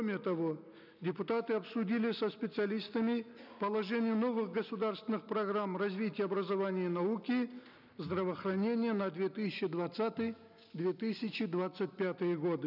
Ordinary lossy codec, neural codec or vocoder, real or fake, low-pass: none; none; real; 5.4 kHz